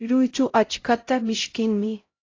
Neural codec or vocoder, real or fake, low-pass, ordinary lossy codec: codec, 16 kHz, 0.5 kbps, X-Codec, HuBERT features, trained on LibriSpeech; fake; 7.2 kHz; AAC, 32 kbps